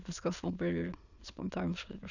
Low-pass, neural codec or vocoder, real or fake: 7.2 kHz; autoencoder, 22.05 kHz, a latent of 192 numbers a frame, VITS, trained on many speakers; fake